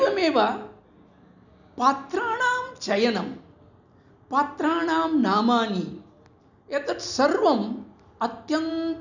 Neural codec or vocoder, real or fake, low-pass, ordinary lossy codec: none; real; 7.2 kHz; none